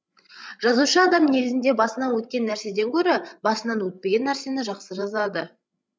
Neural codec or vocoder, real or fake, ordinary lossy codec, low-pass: codec, 16 kHz, 16 kbps, FreqCodec, larger model; fake; none; none